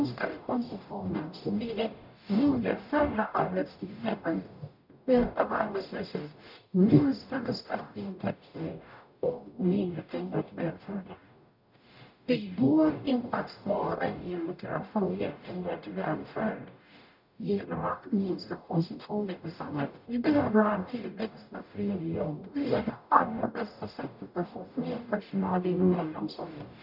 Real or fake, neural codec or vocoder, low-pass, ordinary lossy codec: fake; codec, 44.1 kHz, 0.9 kbps, DAC; 5.4 kHz; none